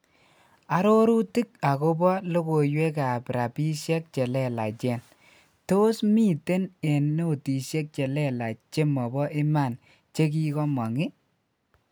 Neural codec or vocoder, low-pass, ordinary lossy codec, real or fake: none; none; none; real